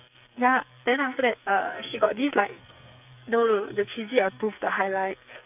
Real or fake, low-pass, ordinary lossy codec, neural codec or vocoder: fake; 3.6 kHz; none; codec, 44.1 kHz, 2.6 kbps, SNAC